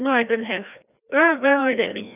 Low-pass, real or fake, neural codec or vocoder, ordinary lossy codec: 3.6 kHz; fake; codec, 16 kHz, 1 kbps, FreqCodec, larger model; none